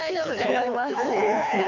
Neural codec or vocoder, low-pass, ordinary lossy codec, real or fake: codec, 24 kHz, 6 kbps, HILCodec; 7.2 kHz; none; fake